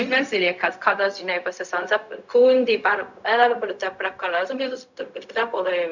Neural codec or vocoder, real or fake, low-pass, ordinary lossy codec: codec, 16 kHz, 0.4 kbps, LongCat-Audio-Codec; fake; 7.2 kHz; none